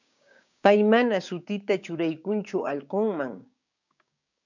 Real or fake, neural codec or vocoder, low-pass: fake; codec, 16 kHz, 6 kbps, DAC; 7.2 kHz